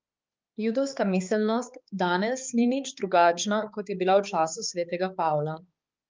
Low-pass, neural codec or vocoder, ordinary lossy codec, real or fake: 7.2 kHz; codec, 16 kHz, 4 kbps, X-Codec, HuBERT features, trained on balanced general audio; Opus, 32 kbps; fake